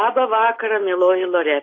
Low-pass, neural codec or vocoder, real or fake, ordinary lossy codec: 7.2 kHz; none; real; AAC, 32 kbps